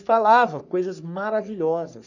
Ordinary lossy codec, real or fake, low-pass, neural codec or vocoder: none; fake; 7.2 kHz; codec, 44.1 kHz, 3.4 kbps, Pupu-Codec